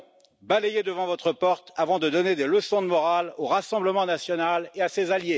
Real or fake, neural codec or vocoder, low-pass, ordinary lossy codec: real; none; none; none